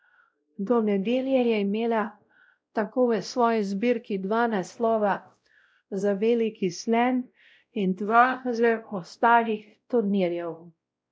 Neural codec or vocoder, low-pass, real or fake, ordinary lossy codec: codec, 16 kHz, 0.5 kbps, X-Codec, WavLM features, trained on Multilingual LibriSpeech; none; fake; none